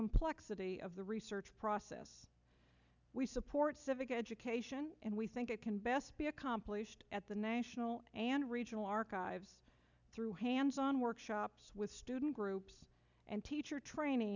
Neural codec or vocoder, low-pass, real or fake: none; 7.2 kHz; real